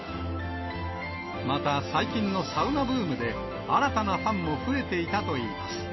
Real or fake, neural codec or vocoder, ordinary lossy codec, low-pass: real; none; MP3, 24 kbps; 7.2 kHz